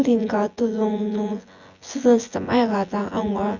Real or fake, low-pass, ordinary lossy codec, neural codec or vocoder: fake; 7.2 kHz; Opus, 64 kbps; vocoder, 24 kHz, 100 mel bands, Vocos